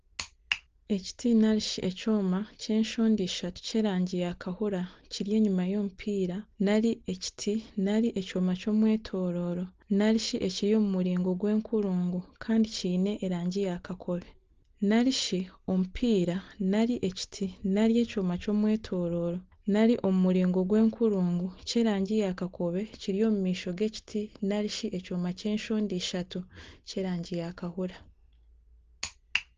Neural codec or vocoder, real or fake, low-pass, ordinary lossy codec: none; real; 7.2 kHz; Opus, 16 kbps